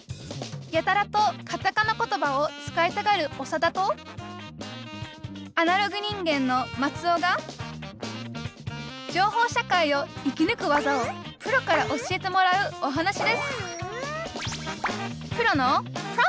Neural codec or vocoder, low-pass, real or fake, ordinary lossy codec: none; none; real; none